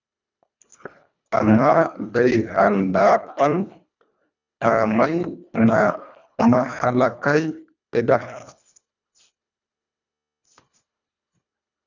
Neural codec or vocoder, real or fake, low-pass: codec, 24 kHz, 1.5 kbps, HILCodec; fake; 7.2 kHz